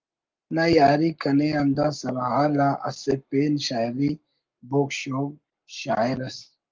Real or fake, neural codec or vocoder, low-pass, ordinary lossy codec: fake; codec, 44.1 kHz, 7.8 kbps, Pupu-Codec; 7.2 kHz; Opus, 32 kbps